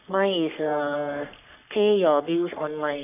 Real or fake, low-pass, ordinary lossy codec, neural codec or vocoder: fake; 3.6 kHz; none; codec, 44.1 kHz, 3.4 kbps, Pupu-Codec